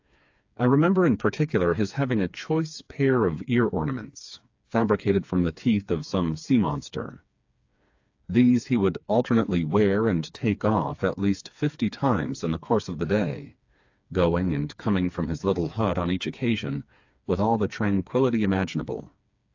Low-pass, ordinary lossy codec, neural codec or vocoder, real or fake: 7.2 kHz; AAC, 48 kbps; codec, 16 kHz, 4 kbps, FreqCodec, smaller model; fake